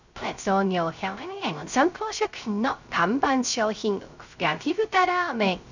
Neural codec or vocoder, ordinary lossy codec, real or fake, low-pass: codec, 16 kHz, 0.3 kbps, FocalCodec; none; fake; 7.2 kHz